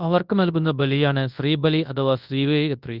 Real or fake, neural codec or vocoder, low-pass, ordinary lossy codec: fake; codec, 24 kHz, 0.9 kbps, WavTokenizer, large speech release; 5.4 kHz; Opus, 32 kbps